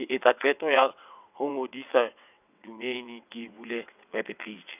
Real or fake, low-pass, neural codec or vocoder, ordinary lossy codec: fake; 3.6 kHz; vocoder, 22.05 kHz, 80 mel bands, WaveNeXt; none